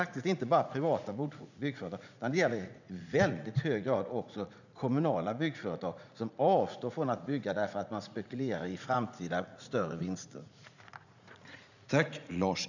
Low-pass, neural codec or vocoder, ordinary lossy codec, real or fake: 7.2 kHz; vocoder, 44.1 kHz, 80 mel bands, Vocos; none; fake